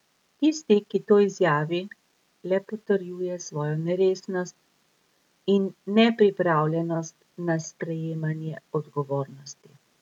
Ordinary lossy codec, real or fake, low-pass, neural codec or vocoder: none; real; 19.8 kHz; none